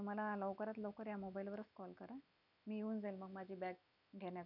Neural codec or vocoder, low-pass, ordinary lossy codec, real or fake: none; 5.4 kHz; none; real